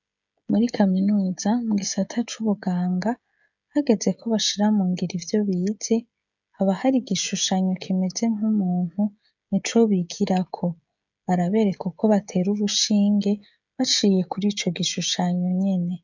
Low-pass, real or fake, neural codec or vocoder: 7.2 kHz; fake; codec, 16 kHz, 16 kbps, FreqCodec, smaller model